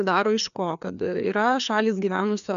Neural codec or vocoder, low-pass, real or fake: codec, 16 kHz, 2 kbps, FreqCodec, larger model; 7.2 kHz; fake